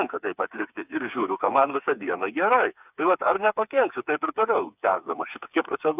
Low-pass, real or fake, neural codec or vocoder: 3.6 kHz; fake; codec, 16 kHz, 4 kbps, FreqCodec, smaller model